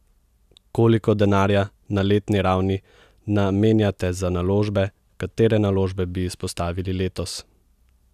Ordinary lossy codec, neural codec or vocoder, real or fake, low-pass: none; none; real; 14.4 kHz